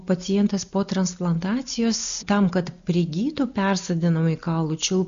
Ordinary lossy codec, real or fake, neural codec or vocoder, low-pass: MP3, 64 kbps; real; none; 7.2 kHz